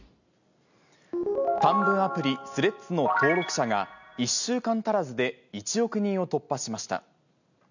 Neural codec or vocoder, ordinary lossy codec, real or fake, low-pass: none; none; real; 7.2 kHz